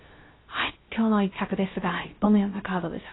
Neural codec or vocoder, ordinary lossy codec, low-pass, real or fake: codec, 16 kHz, 0.3 kbps, FocalCodec; AAC, 16 kbps; 7.2 kHz; fake